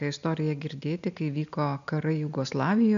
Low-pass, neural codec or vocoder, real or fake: 7.2 kHz; none; real